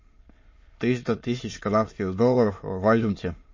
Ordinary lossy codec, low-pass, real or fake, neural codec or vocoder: MP3, 32 kbps; 7.2 kHz; fake; autoencoder, 22.05 kHz, a latent of 192 numbers a frame, VITS, trained on many speakers